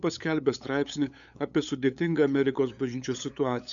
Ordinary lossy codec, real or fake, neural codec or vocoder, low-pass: MP3, 96 kbps; fake; codec, 16 kHz, 8 kbps, FunCodec, trained on LibriTTS, 25 frames a second; 7.2 kHz